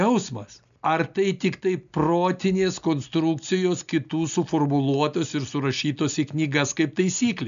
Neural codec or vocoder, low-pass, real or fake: none; 7.2 kHz; real